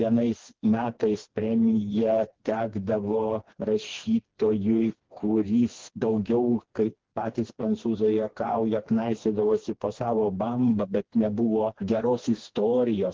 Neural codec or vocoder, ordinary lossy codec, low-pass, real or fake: codec, 16 kHz, 2 kbps, FreqCodec, smaller model; Opus, 16 kbps; 7.2 kHz; fake